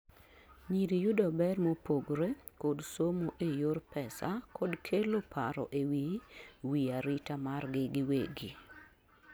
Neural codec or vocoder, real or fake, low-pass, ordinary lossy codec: none; real; none; none